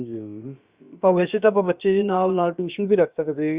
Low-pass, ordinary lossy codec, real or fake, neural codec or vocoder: 3.6 kHz; Opus, 64 kbps; fake; codec, 16 kHz, about 1 kbps, DyCAST, with the encoder's durations